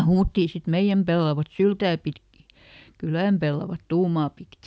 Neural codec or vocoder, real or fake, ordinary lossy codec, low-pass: none; real; none; none